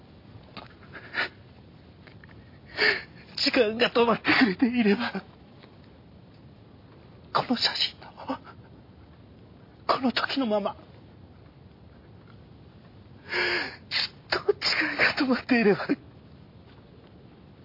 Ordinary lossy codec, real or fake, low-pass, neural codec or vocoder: MP3, 32 kbps; real; 5.4 kHz; none